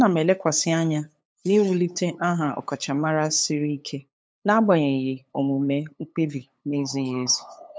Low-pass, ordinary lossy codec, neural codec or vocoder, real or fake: none; none; codec, 16 kHz, 8 kbps, FunCodec, trained on LibriTTS, 25 frames a second; fake